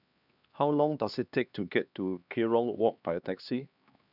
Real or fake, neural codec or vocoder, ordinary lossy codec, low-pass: fake; codec, 16 kHz, 2 kbps, X-Codec, HuBERT features, trained on LibriSpeech; none; 5.4 kHz